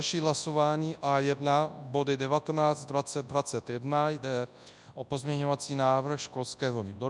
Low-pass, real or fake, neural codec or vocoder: 10.8 kHz; fake; codec, 24 kHz, 0.9 kbps, WavTokenizer, large speech release